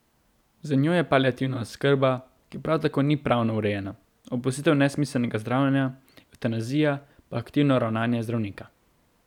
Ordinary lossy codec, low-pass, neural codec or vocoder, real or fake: none; 19.8 kHz; none; real